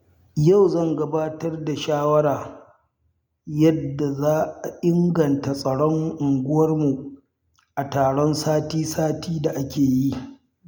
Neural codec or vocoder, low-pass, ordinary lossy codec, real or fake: none; none; none; real